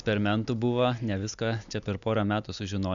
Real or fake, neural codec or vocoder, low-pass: real; none; 7.2 kHz